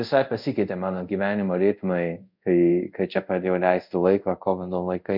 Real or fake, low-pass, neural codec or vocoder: fake; 5.4 kHz; codec, 24 kHz, 0.5 kbps, DualCodec